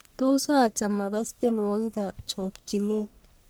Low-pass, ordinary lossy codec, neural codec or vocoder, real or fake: none; none; codec, 44.1 kHz, 1.7 kbps, Pupu-Codec; fake